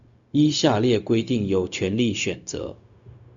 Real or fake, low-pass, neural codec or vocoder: fake; 7.2 kHz; codec, 16 kHz, 0.4 kbps, LongCat-Audio-Codec